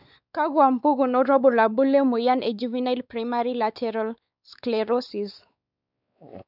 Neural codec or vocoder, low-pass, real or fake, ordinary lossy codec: codec, 16 kHz, 4 kbps, X-Codec, WavLM features, trained on Multilingual LibriSpeech; 5.4 kHz; fake; none